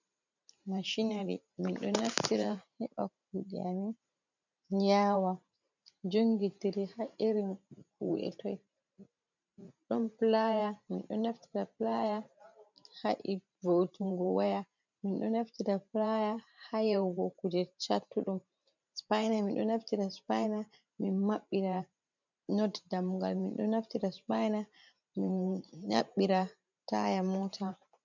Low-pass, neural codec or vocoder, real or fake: 7.2 kHz; vocoder, 44.1 kHz, 128 mel bands every 512 samples, BigVGAN v2; fake